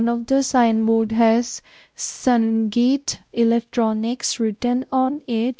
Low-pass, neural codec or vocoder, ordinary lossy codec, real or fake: none; codec, 16 kHz, 0.5 kbps, X-Codec, WavLM features, trained on Multilingual LibriSpeech; none; fake